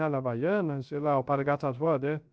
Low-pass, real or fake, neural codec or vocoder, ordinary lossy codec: none; fake; codec, 16 kHz, 0.3 kbps, FocalCodec; none